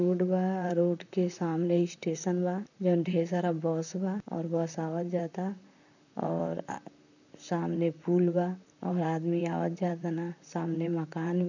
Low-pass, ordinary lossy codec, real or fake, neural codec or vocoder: 7.2 kHz; none; fake; vocoder, 44.1 kHz, 128 mel bands, Pupu-Vocoder